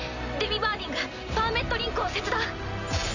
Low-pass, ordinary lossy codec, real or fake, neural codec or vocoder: 7.2 kHz; Opus, 64 kbps; real; none